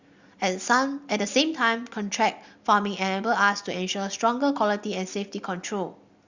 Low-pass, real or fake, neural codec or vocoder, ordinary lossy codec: 7.2 kHz; real; none; Opus, 64 kbps